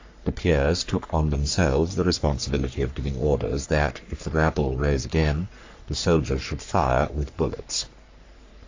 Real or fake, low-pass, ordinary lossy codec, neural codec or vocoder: fake; 7.2 kHz; AAC, 48 kbps; codec, 44.1 kHz, 3.4 kbps, Pupu-Codec